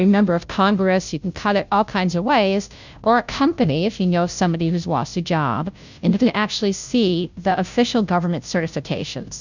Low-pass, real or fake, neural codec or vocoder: 7.2 kHz; fake; codec, 16 kHz, 0.5 kbps, FunCodec, trained on Chinese and English, 25 frames a second